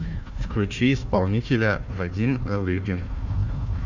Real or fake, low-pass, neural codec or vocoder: fake; 7.2 kHz; codec, 16 kHz, 1 kbps, FunCodec, trained on Chinese and English, 50 frames a second